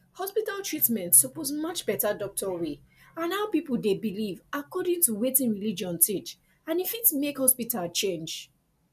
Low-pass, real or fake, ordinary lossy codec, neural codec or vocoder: 14.4 kHz; real; none; none